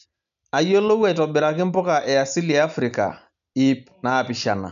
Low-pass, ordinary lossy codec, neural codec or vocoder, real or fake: 7.2 kHz; none; none; real